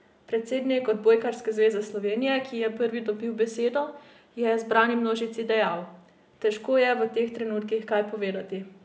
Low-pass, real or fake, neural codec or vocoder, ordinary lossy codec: none; real; none; none